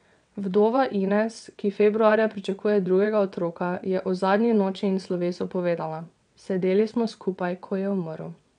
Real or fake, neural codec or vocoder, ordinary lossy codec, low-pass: fake; vocoder, 22.05 kHz, 80 mel bands, WaveNeXt; none; 9.9 kHz